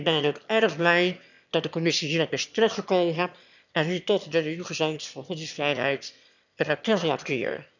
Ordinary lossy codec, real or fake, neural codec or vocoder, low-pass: none; fake; autoencoder, 22.05 kHz, a latent of 192 numbers a frame, VITS, trained on one speaker; 7.2 kHz